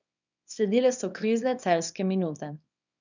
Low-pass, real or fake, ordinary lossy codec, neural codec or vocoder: 7.2 kHz; fake; none; codec, 24 kHz, 0.9 kbps, WavTokenizer, small release